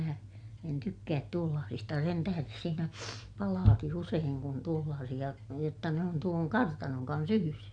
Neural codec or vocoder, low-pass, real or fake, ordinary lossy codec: none; 9.9 kHz; real; none